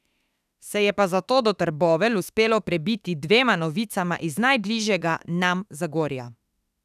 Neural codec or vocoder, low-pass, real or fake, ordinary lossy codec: autoencoder, 48 kHz, 32 numbers a frame, DAC-VAE, trained on Japanese speech; 14.4 kHz; fake; none